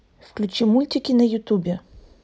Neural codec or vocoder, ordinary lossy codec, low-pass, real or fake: none; none; none; real